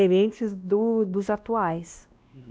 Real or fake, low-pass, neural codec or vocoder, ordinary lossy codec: fake; none; codec, 16 kHz, 1 kbps, X-Codec, WavLM features, trained on Multilingual LibriSpeech; none